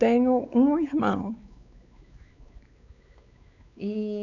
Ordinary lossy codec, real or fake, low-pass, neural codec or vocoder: none; fake; 7.2 kHz; codec, 16 kHz, 4 kbps, X-Codec, WavLM features, trained on Multilingual LibriSpeech